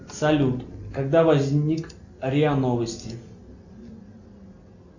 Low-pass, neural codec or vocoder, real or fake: 7.2 kHz; none; real